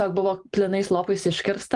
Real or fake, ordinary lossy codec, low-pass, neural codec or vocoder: real; Opus, 32 kbps; 10.8 kHz; none